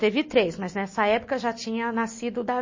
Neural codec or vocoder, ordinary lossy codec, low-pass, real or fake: none; MP3, 32 kbps; 7.2 kHz; real